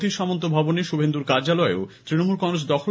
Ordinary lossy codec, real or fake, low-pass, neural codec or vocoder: none; real; none; none